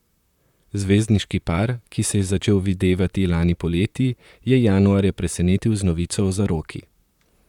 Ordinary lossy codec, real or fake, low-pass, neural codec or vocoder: none; fake; 19.8 kHz; vocoder, 44.1 kHz, 128 mel bands, Pupu-Vocoder